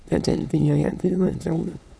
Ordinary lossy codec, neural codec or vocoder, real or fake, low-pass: none; autoencoder, 22.05 kHz, a latent of 192 numbers a frame, VITS, trained on many speakers; fake; none